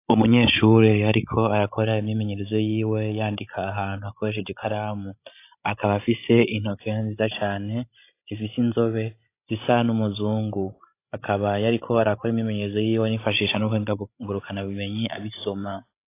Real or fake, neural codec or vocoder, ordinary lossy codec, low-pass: fake; codec, 16 kHz, 16 kbps, FreqCodec, larger model; AAC, 24 kbps; 3.6 kHz